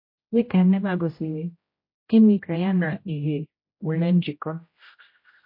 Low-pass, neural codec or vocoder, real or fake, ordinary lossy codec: 5.4 kHz; codec, 16 kHz, 0.5 kbps, X-Codec, HuBERT features, trained on general audio; fake; MP3, 32 kbps